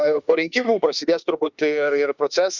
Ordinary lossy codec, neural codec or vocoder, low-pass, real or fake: Opus, 64 kbps; autoencoder, 48 kHz, 32 numbers a frame, DAC-VAE, trained on Japanese speech; 7.2 kHz; fake